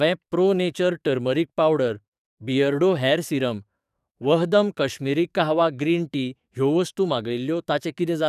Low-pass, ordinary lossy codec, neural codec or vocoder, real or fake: 14.4 kHz; none; codec, 44.1 kHz, 7.8 kbps, DAC; fake